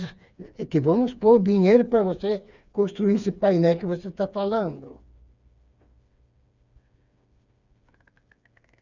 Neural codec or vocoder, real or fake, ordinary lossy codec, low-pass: codec, 16 kHz, 4 kbps, FreqCodec, smaller model; fake; Opus, 64 kbps; 7.2 kHz